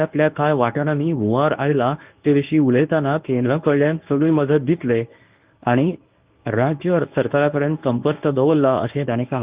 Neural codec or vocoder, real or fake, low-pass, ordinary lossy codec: codec, 24 kHz, 0.9 kbps, WavTokenizer, medium speech release version 1; fake; 3.6 kHz; Opus, 24 kbps